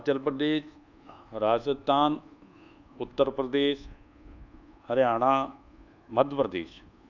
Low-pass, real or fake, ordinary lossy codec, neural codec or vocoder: 7.2 kHz; fake; none; codec, 24 kHz, 1.2 kbps, DualCodec